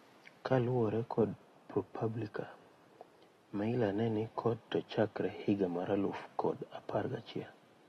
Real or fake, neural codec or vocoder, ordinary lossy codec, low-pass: real; none; AAC, 32 kbps; 14.4 kHz